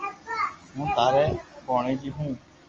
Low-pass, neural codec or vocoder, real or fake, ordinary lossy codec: 7.2 kHz; none; real; Opus, 32 kbps